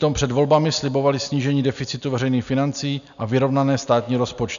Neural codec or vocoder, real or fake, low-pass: none; real; 7.2 kHz